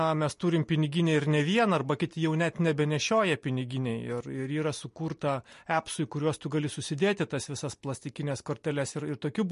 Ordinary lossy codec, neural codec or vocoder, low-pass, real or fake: MP3, 48 kbps; none; 10.8 kHz; real